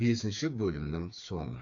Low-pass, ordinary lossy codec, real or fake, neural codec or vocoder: 7.2 kHz; AAC, 64 kbps; fake; codec, 16 kHz, 4 kbps, FreqCodec, smaller model